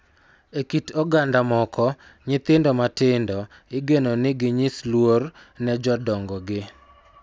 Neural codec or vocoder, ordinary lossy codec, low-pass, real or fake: none; none; none; real